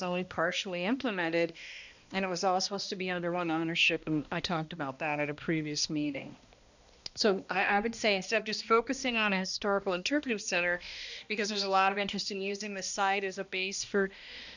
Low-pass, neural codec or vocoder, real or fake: 7.2 kHz; codec, 16 kHz, 1 kbps, X-Codec, HuBERT features, trained on balanced general audio; fake